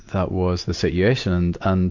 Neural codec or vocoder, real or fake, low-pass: none; real; 7.2 kHz